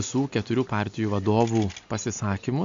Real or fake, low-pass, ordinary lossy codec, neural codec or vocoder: real; 7.2 kHz; MP3, 64 kbps; none